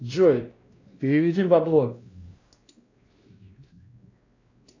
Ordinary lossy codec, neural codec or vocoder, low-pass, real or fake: AAC, 32 kbps; codec, 16 kHz, 1 kbps, X-Codec, WavLM features, trained on Multilingual LibriSpeech; 7.2 kHz; fake